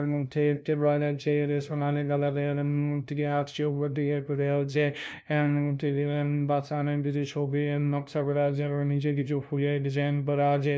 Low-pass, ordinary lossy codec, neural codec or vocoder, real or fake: none; none; codec, 16 kHz, 0.5 kbps, FunCodec, trained on LibriTTS, 25 frames a second; fake